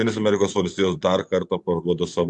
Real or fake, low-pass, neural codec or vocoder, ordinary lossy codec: fake; 10.8 kHz; vocoder, 44.1 kHz, 128 mel bands every 512 samples, BigVGAN v2; MP3, 64 kbps